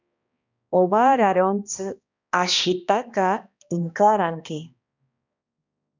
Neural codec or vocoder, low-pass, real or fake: codec, 16 kHz, 1 kbps, X-Codec, HuBERT features, trained on balanced general audio; 7.2 kHz; fake